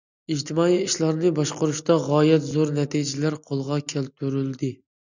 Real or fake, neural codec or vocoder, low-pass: real; none; 7.2 kHz